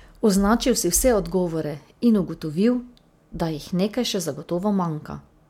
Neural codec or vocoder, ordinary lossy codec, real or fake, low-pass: none; MP3, 96 kbps; real; 19.8 kHz